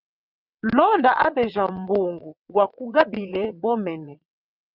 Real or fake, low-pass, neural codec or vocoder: fake; 5.4 kHz; vocoder, 22.05 kHz, 80 mel bands, WaveNeXt